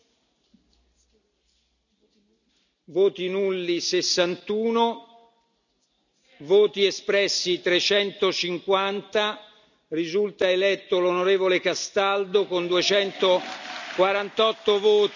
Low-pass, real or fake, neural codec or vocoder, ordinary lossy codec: 7.2 kHz; real; none; none